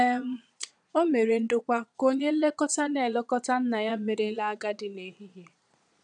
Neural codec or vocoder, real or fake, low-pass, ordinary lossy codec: vocoder, 22.05 kHz, 80 mel bands, Vocos; fake; 9.9 kHz; none